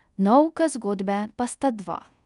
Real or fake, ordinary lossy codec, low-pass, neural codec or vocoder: fake; none; 10.8 kHz; codec, 24 kHz, 0.5 kbps, DualCodec